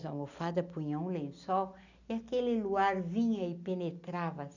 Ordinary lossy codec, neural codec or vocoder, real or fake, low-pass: none; none; real; 7.2 kHz